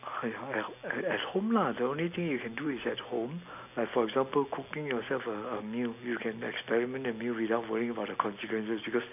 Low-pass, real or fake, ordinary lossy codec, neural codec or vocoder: 3.6 kHz; real; AAC, 32 kbps; none